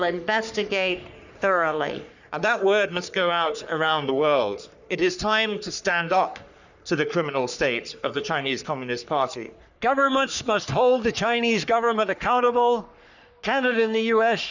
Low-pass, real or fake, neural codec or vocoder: 7.2 kHz; fake; codec, 44.1 kHz, 3.4 kbps, Pupu-Codec